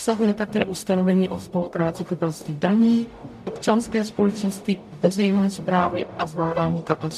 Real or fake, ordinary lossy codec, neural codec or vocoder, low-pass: fake; MP3, 96 kbps; codec, 44.1 kHz, 0.9 kbps, DAC; 14.4 kHz